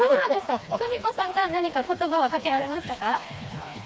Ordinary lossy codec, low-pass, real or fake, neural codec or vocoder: none; none; fake; codec, 16 kHz, 2 kbps, FreqCodec, smaller model